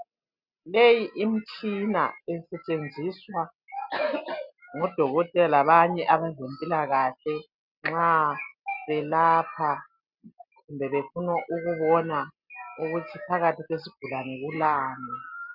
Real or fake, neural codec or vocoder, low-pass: real; none; 5.4 kHz